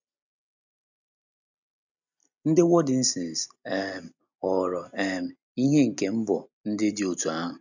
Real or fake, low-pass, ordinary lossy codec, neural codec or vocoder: real; 7.2 kHz; none; none